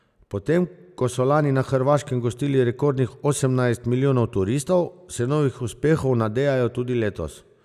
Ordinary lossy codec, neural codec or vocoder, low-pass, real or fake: none; none; 14.4 kHz; real